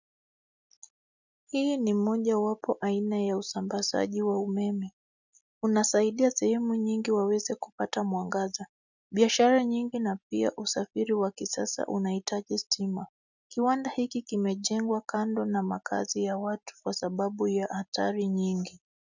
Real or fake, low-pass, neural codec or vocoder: real; 7.2 kHz; none